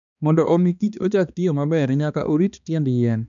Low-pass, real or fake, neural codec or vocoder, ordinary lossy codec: 7.2 kHz; fake; codec, 16 kHz, 2 kbps, X-Codec, HuBERT features, trained on balanced general audio; none